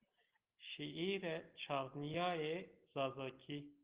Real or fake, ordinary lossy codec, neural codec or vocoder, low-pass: real; Opus, 16 kbps; none; 3.6 kHz